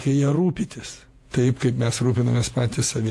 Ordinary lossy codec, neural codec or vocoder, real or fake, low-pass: AAC, 64 kbps; vocoder, 48 kHz, 128 mel bands, Vocos; fake; 14.4 kHz